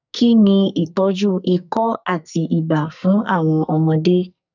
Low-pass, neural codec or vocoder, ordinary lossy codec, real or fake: 7.2 kHz; codec, 44.1 kHz, 2.6 kbps, SNAC; none; fake